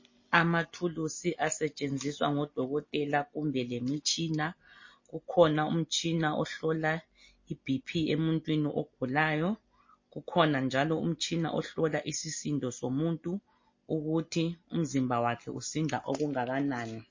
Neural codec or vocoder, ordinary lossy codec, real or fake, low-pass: none; MP3, 32 kbps; real; 7.2 kHz